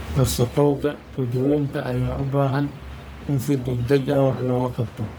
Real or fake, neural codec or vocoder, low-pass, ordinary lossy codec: fake; codec, 44.1 kHz, 1.7 kbps, Pupu-Codec; none; none